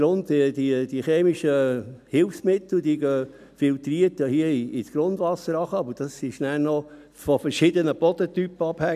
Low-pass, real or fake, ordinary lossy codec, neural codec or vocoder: 14.4 kHz; real; none; none